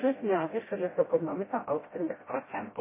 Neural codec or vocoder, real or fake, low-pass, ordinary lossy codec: codec, 16 kHz, 0.5 kbps, FreqCodec, smaller model; fake; 3.6 kHz; MP3, 16 kbps